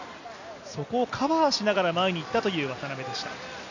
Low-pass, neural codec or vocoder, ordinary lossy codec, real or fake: 7.2 kHz; none; none; real